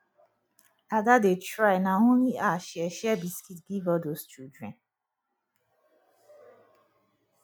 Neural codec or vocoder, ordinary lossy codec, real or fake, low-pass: none; none; real; none